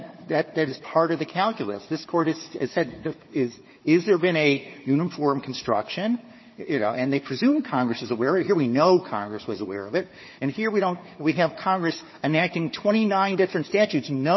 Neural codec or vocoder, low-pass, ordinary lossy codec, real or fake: codec, 16 kHz, 4 kbps, FunCodec, trained on Chinese and English, 50 frames a second; 7.2 kHz; MP3, 24 kbps; fake